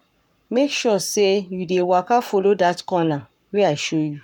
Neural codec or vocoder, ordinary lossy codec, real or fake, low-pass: codec, 44.1 kHz, 7.8 kbps, Pupu-Codec; none; fake; 19.8 kHz